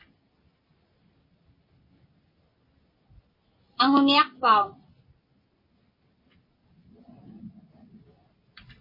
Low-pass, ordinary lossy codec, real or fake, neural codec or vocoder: 5.4 kHz; MP3, 24 kbps; real; none